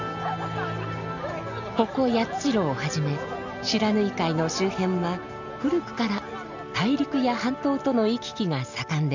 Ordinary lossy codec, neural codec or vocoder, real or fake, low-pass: none; none; real; 7.2 kHz